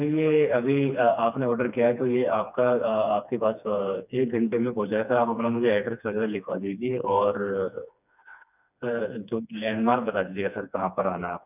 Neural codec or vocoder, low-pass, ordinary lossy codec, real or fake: codec, 16 kHz, 2 kbps, FreqCodec, smaller model; 3.6 kHz; none; fake